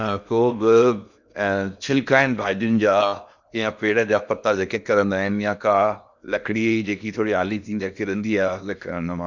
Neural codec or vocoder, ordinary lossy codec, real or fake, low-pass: codec, 16 kHz in and 24 kHz out, 0.8 kbps, FocalCodec, streaming, 65536 codes; none; fake; 7.2 kHz